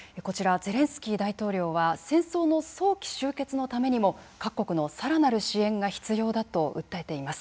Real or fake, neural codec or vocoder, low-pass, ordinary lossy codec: real; none; none; none